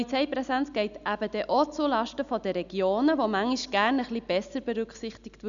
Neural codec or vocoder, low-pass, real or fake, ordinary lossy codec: none; 7.2 kHz; real; none